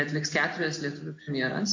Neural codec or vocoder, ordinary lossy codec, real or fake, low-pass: none; MP3, 48 kbps; real; 7.2 kHz